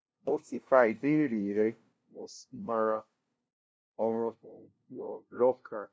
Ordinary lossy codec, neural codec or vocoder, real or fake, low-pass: none; codec, 16 kHz, 0.5 kbps, FunCodec, trained on LibriTTS, 25 frames a second; fake; none